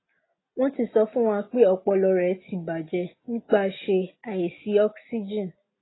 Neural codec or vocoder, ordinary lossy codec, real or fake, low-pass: none; AAC, 16 kbps; real; 7.2 kHz